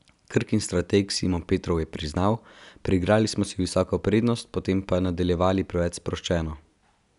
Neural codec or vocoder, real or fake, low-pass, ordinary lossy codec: none; real; 10.8 kHz; none